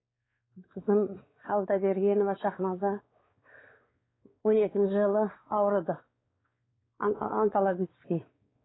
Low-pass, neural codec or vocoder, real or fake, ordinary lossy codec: 7.2 kHz; codec, 16 kHz, 4 kbps, X-Codec, WavLM features, trained on Multilingual LibriSpeech; fake; AAC, 16 kbps